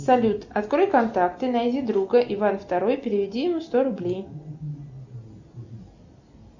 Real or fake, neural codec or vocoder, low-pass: real; none; 7.2 kHz